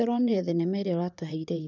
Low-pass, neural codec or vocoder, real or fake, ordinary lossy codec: 7.2 kHz; vocoder, 44.1 kHz, 128 mel bands, Pupu-Vocoder; fake; none